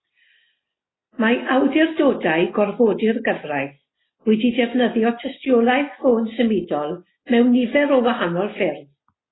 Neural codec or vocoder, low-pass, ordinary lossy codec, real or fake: none; 7.2 kHz; AAC, 16 kbps; real